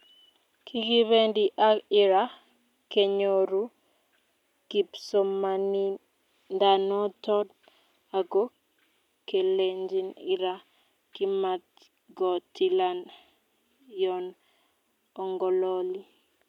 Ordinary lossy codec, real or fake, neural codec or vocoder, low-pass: none; real; none; 19.8 kHz